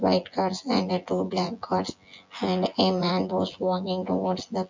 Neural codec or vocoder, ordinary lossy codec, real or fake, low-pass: vocoder, 24 kHz, 100 mel bands, Vocos; MP3, 48 kbps; fake; 7.2 kHz